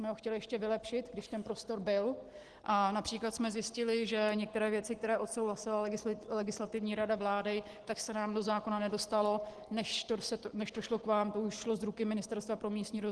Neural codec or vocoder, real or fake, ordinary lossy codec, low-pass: none; real; Opus, 16 kbps; 10.8 kHz